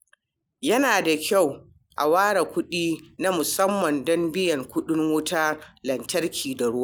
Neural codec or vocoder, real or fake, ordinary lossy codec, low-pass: none; real; none; none